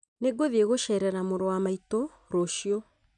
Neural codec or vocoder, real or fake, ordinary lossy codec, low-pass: none; real; none; 9.9 kHz